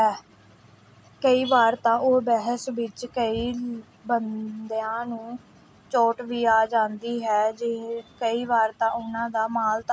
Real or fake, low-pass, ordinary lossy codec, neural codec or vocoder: real; none; none; none